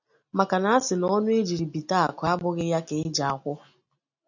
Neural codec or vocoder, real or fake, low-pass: none; real; 7.2 kHz